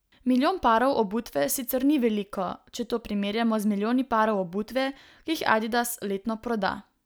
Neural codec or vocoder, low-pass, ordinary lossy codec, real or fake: none; none; none; real